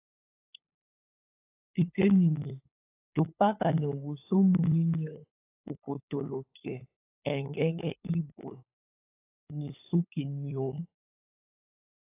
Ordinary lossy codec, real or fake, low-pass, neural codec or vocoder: AAC, 24 kbps; fake; 3.6 kHz; codec, 16 kHz, 8 kbps, FunCodec, trained on LibriTTS, 25 frames a second